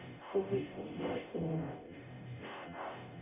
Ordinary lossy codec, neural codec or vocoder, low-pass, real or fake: none; codec, 44.1 kHz, 0.9 kbps, DAC; 3.6 kHz; fake